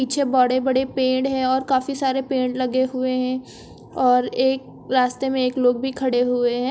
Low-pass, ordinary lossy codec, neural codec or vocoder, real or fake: none; none; none; real